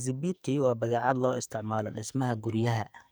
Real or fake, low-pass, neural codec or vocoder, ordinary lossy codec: fake; none; codec, 44.1 kHz, 2.6 kbps, SNAC; none